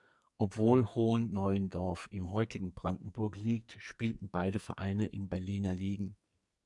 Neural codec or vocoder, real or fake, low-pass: codec, 44.1 kHz, 2.6 kbps, SNAC; fake; 10.8 kHz